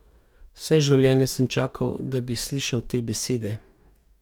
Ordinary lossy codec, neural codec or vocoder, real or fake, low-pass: none; codec, 44.1 kHz, 2.6 kbps, DAC; fake; 19.8 kHz